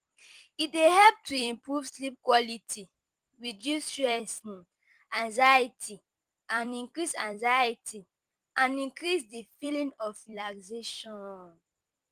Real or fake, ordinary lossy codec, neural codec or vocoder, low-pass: fake; Opus, 24 kbps; vocoder, 44.1 kHz, 128 mel bands every 256 samples, BigVGAN v2; 14.4 kHz